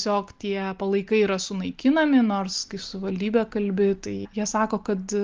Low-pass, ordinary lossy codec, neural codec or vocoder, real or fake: 7.2 kHz; Opus, 32 kbps; none; real